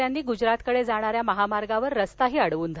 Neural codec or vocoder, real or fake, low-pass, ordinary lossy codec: none; real; none; none